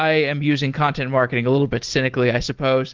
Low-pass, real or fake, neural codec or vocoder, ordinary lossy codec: 7.2 kHz; real; none; Opus, 16 kbps